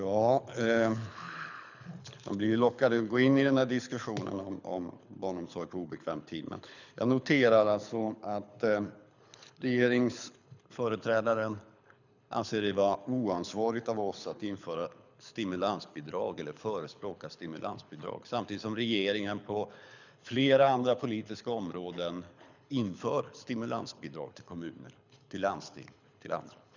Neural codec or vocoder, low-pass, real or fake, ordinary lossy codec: codec, 24 kHz, 6 kbps, HILCodec; 7.2 kHz; fake; none